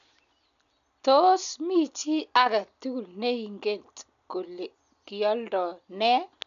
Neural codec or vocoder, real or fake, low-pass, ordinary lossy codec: none; real; 7.2 kHz; none